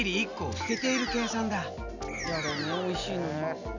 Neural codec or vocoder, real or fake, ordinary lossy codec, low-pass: none; real; none; 7.2 kHz